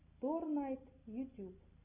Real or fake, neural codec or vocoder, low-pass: real; none; 3.6 kHz